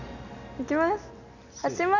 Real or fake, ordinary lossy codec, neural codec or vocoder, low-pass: real; none; none; 7.2 kHz